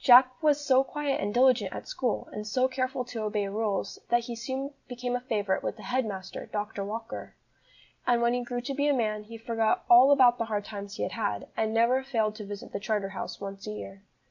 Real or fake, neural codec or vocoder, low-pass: real; none; 7.2 kHz